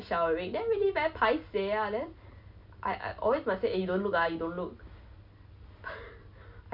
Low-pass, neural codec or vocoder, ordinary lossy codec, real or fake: 5.4 kHz; none; MP3, 48 kbps; real